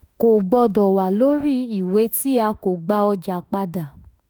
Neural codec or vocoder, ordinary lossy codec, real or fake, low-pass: autoencoder, 48 kHz, 32 numbers a frame, DAC-VAE, trained on Japanese speech; none; fake; none